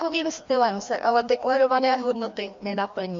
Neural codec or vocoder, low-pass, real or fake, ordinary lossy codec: codec, 16 kHz, 1 kbps, FreqCodec, larger model; 7.2 kHz; fake; MP3, 48 kbps